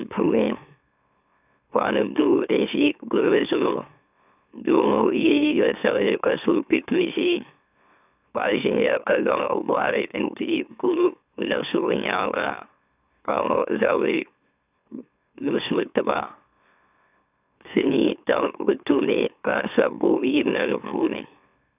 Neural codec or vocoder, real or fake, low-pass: autoencoder, 44.1 kHz, a latent of 192 numbers a frame, MeloTTS; fake; 3.6 kHz